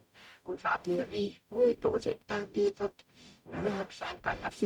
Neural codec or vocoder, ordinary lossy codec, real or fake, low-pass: codec, 44.1 kHz, 0.9 kbps, DAC; none; fake; 19.8 kHz